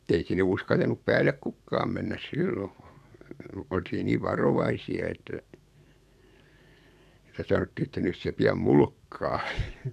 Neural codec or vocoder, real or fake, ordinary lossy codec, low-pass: codec, 44.1 kHz, 7.8 kbps, DAC; fake; none; 14.4 kHz